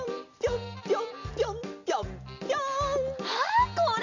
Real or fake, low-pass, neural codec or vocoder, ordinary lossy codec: real; 7.2 kHz; none; Opus, 64 kbps